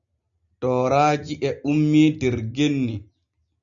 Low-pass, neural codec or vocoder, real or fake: 7.2 kHz; none; real